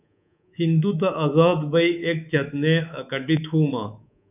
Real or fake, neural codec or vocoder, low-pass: fake; codec, 24 kHz, 3.1 kbps, DualCodec; 3.6 kHz